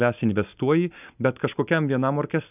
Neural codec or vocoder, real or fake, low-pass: none; real; 3.6 kHz